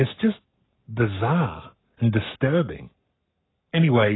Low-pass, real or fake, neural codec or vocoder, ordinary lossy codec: 7.2 kHz; fake; codec, 44.1 kHz, 7.8 kbps, Pupu-Codec; AAC, 16 kbps